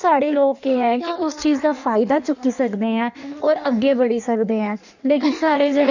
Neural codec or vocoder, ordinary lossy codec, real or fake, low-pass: codec, 16 kHz in and 24 kHz out, 1.1 kbps, FireRedTTS-2 codec; none; fake; 7.2 kHz